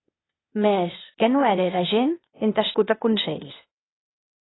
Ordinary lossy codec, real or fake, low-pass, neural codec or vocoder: AAC, 16 kbps; fake; 7.2 kHz; codec, 16 kHz, 0.8 kbps, ZipCodec